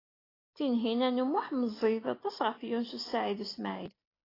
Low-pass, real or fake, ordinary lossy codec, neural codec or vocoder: 5.4 kHz; real; AAC, 24 kbps; none